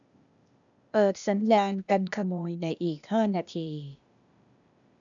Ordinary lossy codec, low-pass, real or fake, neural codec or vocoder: none; 7.2 kHz; fake; codec, 16 kHz, 0.8 kbps, ZipCodec